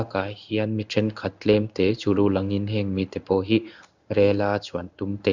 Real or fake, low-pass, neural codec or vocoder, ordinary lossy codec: fake; 7.2 kHz; codec, 16 kHz in and 24 kHz out, 1 kbps, XY-Tokenizer; Opus, 64 kbps